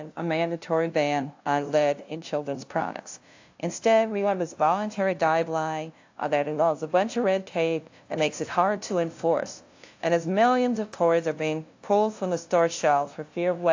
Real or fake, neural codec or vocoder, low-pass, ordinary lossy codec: fake; codec, 16 kHz, 0.5 kbps, FunCodec, trained on LibriTTS, 25 frames a second; 7.2 kHz; AAC, 48 kbps